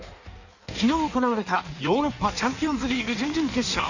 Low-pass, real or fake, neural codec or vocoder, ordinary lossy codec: 7.2 kHz; fake; codec, 16 kHz in and 24 kHz out, 1.1 kbps, FireRedTTS-2 codec; none